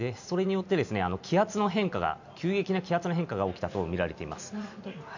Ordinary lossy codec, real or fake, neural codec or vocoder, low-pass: none; real; none; 7.2 kHz